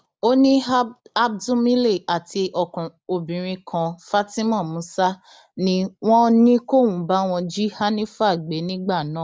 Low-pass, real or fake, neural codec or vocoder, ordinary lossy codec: none; real; none; none